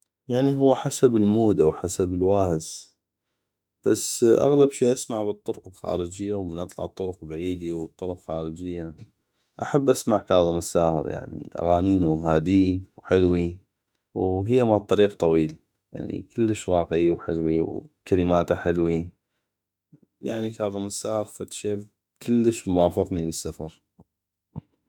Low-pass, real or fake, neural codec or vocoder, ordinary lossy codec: 19.8 kHz; fake; autoencoder, 48 kHz, 32 numbers a frame, DAC-VAE, trained on Japanese speech; none